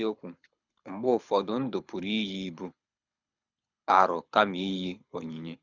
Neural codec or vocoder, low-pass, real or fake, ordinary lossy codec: codec, 24 kHz, 6 kbps, HILCodec; 7.2 kHz; fake; none